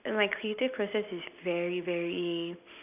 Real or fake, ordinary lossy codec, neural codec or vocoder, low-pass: real; none; none; 3.6 kHz